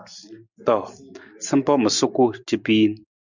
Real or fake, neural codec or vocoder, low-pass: real; none; 7.2 kHz